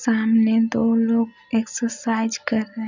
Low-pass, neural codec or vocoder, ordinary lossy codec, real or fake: 7.2 kHz; none; none; real